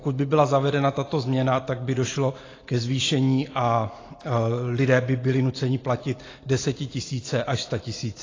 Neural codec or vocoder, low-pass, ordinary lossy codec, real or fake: none; 7.2 kHz; AAC, 32 kbps; real